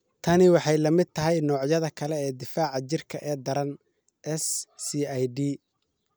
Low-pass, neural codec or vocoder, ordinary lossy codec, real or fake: none; none; none; real